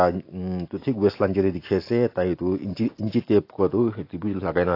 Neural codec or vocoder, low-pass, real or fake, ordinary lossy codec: none; 5.4 kHz; real; AAC, 32 kbps